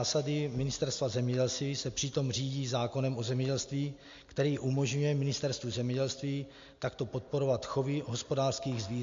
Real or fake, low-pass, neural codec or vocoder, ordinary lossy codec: real; 7.2 kHz; none; MP3, 48 kbps